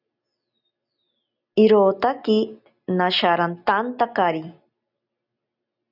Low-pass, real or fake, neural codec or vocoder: 5.4 kHz; real; none